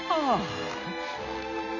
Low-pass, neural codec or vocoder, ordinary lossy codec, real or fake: 7.2 kHz; none; none; real